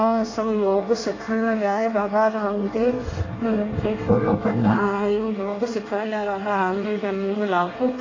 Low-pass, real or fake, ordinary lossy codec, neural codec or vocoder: 7.2 kHz; fake; AAC, 32 kbps; codec, 24 kHz, 1 kbps, SNAC